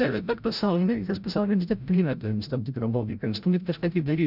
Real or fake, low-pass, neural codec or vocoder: fake; 5.4 kHz; codec, 16 kHz, 0.5 kbps, FreqCodec, larger model